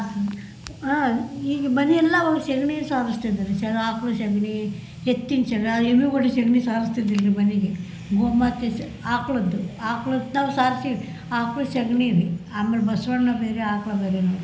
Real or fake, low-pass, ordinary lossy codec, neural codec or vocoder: real; none; none; none